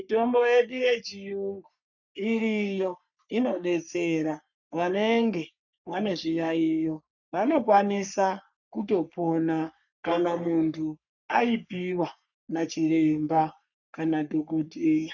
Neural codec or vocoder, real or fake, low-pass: codec, 44.1 kHz, 3.4 kbps, Pupu-Codec; fake; 7.2 kHz